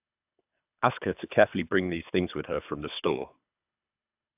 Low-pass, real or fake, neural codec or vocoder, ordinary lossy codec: 3.6 kHz; fake; codec, 24 kHz, 3 kbps, HILCodec; AAC, 32 kbps